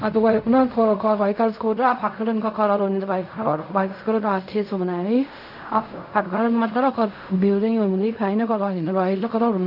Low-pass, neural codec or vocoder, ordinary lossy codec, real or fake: 5.4 kHz; codec, 16 kHz in and 24 kHz out, 0.4 kbps, LongCat-Audio-Codec, fine tuned four codebook decoder; none; fake